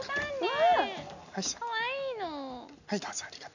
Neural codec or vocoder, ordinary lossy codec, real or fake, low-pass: none; none; real; 7.2 kHz